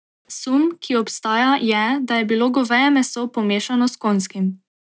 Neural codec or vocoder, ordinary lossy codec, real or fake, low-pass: none; none; real; none